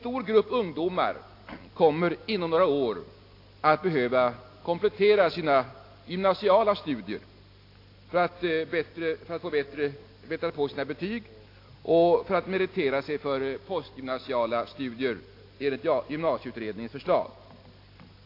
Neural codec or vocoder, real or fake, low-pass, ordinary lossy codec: none; real; 5.4 kHz; AAC, 32 kbps